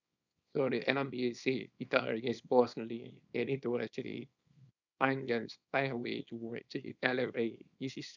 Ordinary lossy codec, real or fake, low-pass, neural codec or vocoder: none; fake; 7.2 kHz; codec, 24 kHz, 0.9 kbps, WavTokenizer, small release